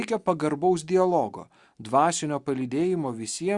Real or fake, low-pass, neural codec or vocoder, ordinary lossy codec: real; 10.8 kHz; none; Opus, 64 kbps